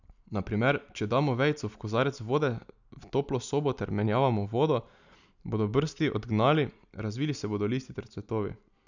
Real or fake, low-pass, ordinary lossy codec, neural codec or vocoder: real; 7.2 kHz; none; none